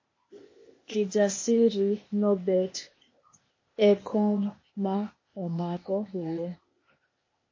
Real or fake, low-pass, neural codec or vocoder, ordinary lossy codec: fake; 7.2 kHz; codec, 16 kHz, 0.8 kbps, ZipCodec; MP3, 32 kbps